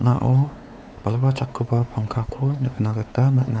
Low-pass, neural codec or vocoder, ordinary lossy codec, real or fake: none; codec, 16 kHz, 4 kbps, X-Codec, WavLM features, trained on Multilingual LibriSpeech; none; fake